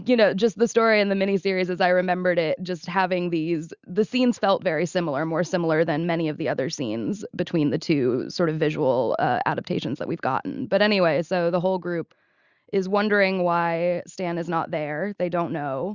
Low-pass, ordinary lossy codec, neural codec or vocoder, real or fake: 7.2 kHz; Opus, 64 kbps; none; real